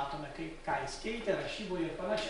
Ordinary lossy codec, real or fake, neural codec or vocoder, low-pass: MP3, 64 kbps; real; none; 10.8 kHz